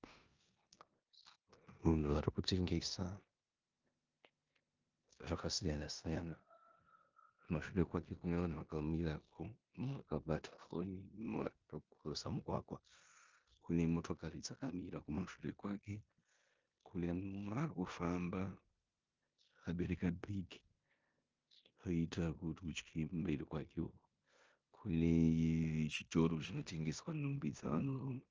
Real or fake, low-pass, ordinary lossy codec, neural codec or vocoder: fake; 7.2 kHz; Opus, 24 kbps; codec, 16 kHz in and 24 kHz out, 0.9 kbps, LongCat-Audio-Codec, four codebook decoder